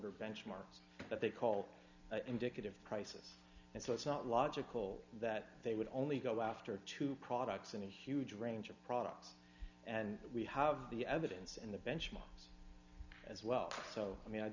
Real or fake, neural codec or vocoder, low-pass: real; none; 7.2 kHz